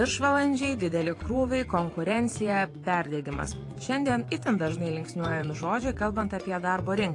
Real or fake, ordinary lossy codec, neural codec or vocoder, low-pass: fake; AAC, 48 kbps; vocoder, 24 kHz, 100 mel bands, Vocos; 10.8 kHz